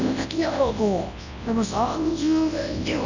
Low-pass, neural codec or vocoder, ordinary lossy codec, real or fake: 7.2 kHz; codec, 24 kHz, 0.9 kbps, WavTokenizer, large speech release; none; fake